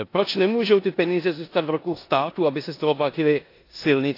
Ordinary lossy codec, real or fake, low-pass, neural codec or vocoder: AAC, 32 kbps; fake; 5.4 kHz; codec, 16 kHz in and 24 kHz out, 0.9 kbps, LongCat-Audio-Codec, four codebook decoder